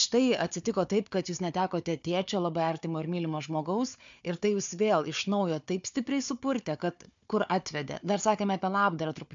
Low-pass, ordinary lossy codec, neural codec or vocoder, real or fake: 7.2 kHz; MP3, 64 kbps; codec, 16 kHz, 4 kbps, FunCodec, trained on Chinese and English, 50 frames a second; fake